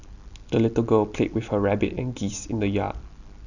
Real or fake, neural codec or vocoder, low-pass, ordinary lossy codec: real; none; 7.2 kHz; none